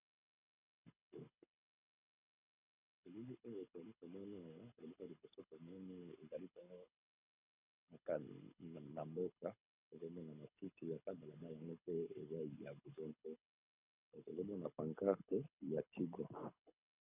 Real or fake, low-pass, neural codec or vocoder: fake; 3.6 kHz; codec, 24 kHz, 6 kbps, HILCodec